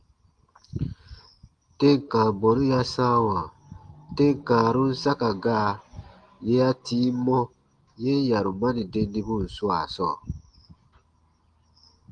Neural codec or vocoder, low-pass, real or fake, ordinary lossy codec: none; 9.9 kHz; real; Opus, 24 kbps